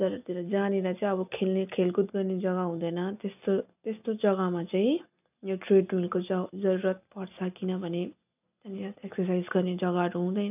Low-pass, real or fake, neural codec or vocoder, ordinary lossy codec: 3.6 kHz; real; none; none